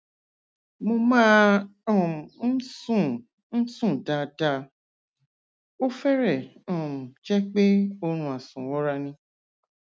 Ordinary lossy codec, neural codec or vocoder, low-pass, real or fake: none; none; none; real